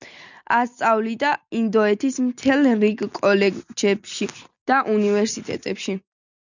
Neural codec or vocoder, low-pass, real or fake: none; 7.2 kHz; real